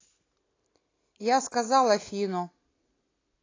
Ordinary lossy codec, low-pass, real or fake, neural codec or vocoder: AAC, 32 kbps; 7.2 kHz; real; none